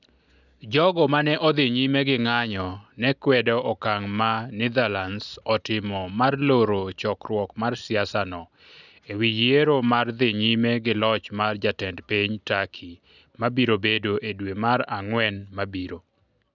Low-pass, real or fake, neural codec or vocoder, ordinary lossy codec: 7.2 kHz; real; none; none